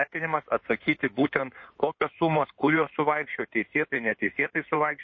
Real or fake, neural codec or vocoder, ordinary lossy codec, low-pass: fake; codec, 16 kHz in and 24 kHz out, 2.2 kbps, FireRedTTS-2 codec; MP3, 32 kbps; 7.2 kHz